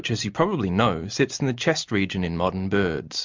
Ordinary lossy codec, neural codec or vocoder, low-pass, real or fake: MP3, 64 kbps; none; 7.2 kHz; real